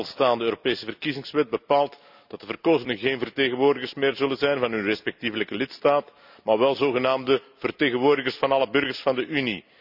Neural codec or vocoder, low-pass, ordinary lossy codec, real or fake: none; 5.4 kHz; none; real